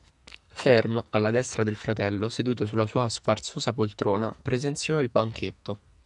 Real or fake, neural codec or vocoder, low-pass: fake; codec, 44.1 kHz, 2.6 kbps, SNAC; 10.8 kHz